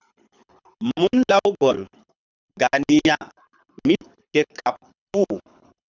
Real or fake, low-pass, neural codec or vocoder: fake; 7.2 kHz; codec, 24 kHz, 6 kbps, HILCodec